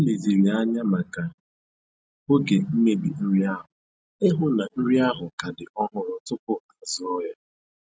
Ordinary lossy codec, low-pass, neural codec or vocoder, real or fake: none; none; none; real